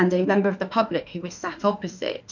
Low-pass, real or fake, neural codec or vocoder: 7.2 kHz; fake; autoencoder, 48 kHz, 32 numbers a frame, DAC-VAE, trained on Japanese speech